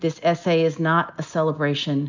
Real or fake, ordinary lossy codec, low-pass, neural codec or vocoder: real; MP3, 64 kbps; 7.2 kHz; none